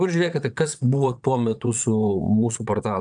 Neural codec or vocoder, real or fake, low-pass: vocoder, 22.05 kHz, 80 mel bands, Vocos; fake; 9.9 kHz